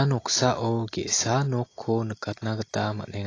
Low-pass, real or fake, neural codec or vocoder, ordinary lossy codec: 7.2 kHz; real; none; AAC, 32 kbps